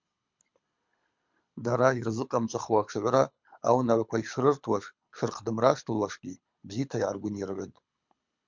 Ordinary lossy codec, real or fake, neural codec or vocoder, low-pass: MP3, 64 kbps; fake; codec, 24 kHz, 6 kbps, HILCodec; 7.2 kHz